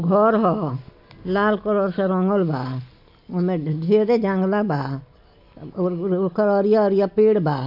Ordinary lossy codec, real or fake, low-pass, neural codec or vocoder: AAC, 48 kbps; real; 5.4 kHz; none